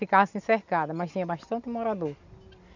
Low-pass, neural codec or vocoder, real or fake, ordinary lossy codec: 7.2 kHz; none; real; none